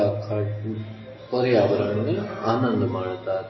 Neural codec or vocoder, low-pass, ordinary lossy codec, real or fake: none; 7.2 kHz; MP3, 24 kbps; real